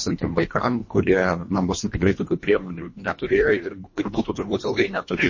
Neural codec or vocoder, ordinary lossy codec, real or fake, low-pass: codec, 24 kHz, 1.5 kbps, HILCodec; MP3, 32 kbps; fake; 7.2 kHz